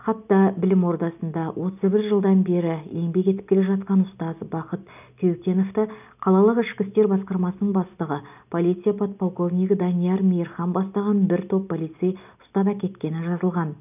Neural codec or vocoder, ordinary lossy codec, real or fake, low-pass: none; none; real; 3.6 kHz